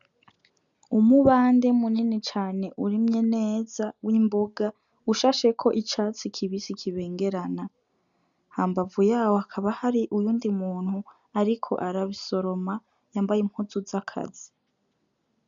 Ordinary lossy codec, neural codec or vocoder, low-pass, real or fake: MP3, 96 kbps; none; 7.2 kHz; real